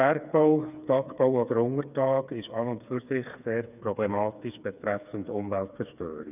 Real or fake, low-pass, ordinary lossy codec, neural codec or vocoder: fake; 3.6 kHz; none; codec, 16 kHz, 4 kbps, FreqCodec, smaller model